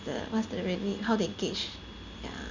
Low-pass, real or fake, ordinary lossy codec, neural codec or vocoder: 7.2 kHz; real; none; none